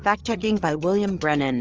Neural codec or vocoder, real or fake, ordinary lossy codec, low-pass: codec, 16 kHz, 8 kbps, FreqCodec, larger model; fake; Opus, 24 kbps; 7.2 kHz